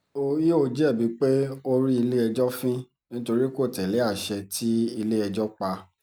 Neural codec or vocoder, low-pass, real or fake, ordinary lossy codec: none; none; real; none